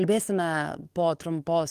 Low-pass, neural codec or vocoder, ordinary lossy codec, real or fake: 14.4 kHz; codec, 44.1 kHz, 7.8 kbps, DAC; Opus, 32 kbps; fake